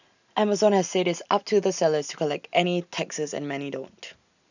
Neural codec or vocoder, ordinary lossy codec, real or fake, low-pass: none; none; real; 7.2 kHz